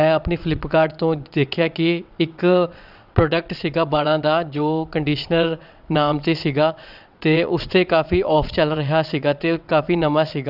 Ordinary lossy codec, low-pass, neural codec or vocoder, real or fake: none; 5.4 kHz; vocoder, 22.05 kHz, 80 mel bands, WaveNeXt; fake